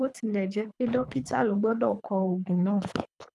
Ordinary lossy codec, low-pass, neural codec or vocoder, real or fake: none; none; codec, 24 kHz, 3 kbps, HILCodec; fake